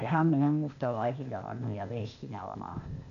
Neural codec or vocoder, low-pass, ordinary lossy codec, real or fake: codec, 16 kHz, 0.8 kbps, ZipCodec; 7.2 kHz; none; fake